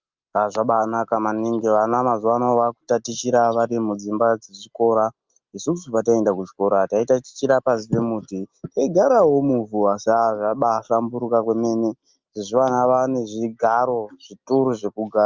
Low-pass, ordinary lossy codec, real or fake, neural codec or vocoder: 7.2 kHz; Opus, 32 kbps; real; none